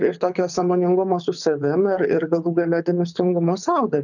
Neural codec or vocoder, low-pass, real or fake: codec, 24 kHz, 6 kbps, HILCodec; 7.2 kHz; fake